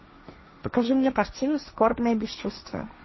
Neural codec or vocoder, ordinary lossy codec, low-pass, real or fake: codec, 16 kHz, 1.1 kbps, Voila-Tokenizer; MP3, 24 kbps; 7.2 kHz; fake